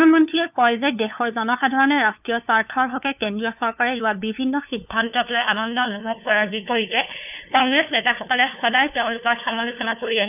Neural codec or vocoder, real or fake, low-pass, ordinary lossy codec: codec, 16 kHz, 2 kbps, FunCodec, trained on LibriTTS, 25 frames a second; fake; 3.6 kHz; none